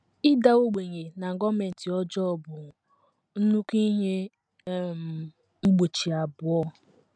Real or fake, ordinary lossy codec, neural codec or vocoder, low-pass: real; MP3, 96 kbps; none; 9.9 kHz